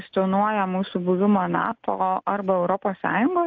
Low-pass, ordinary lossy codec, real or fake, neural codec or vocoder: 7.2 kHz; AAC, 48 kbps; real; none